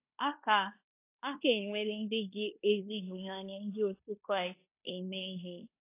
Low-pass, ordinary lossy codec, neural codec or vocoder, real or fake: 3.6 kHz; AAC, 24 kbps; codec, 16 kHz in and 24 kHz out, 0.9 kbps, LongCat-Audio-Codec, fine tuned four codebook decoder; fake